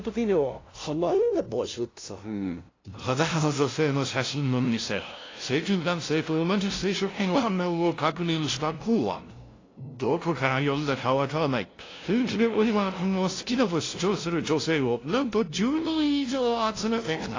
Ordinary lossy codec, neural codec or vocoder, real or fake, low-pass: AAC, 32 kbps; codec, 16 kHz, 0.5 kbps, FunCodec, trained on LibriTTS, 25 frames a second; fake; 7.2 kHz